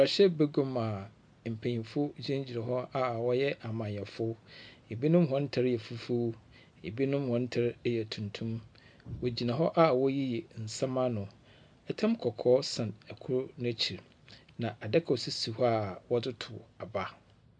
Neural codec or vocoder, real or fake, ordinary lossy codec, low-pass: none; real; MP3, 64 kbps; 9.9 kHz